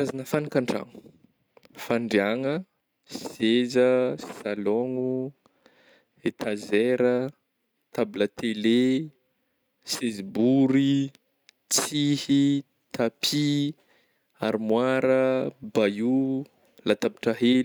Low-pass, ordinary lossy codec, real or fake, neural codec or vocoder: none; none; real; none